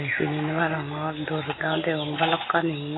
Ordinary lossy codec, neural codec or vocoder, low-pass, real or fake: AAC, 16 kbps; none; 7.2 kHz; real